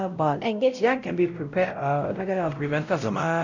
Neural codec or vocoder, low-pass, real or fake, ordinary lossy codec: codec, 16 kHz, 0.5 kbps, X-Codec, WavLM features, trained on Multilingual LibriSpeech; 7.2 kHz; fake; none